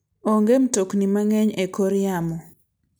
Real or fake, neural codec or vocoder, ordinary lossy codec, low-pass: real; none; none; none